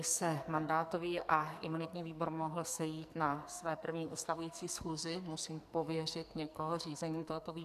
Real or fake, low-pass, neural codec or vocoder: fake; 14.4 kHz; codec, 44.1 kHz, 2.6 kbps, SNAC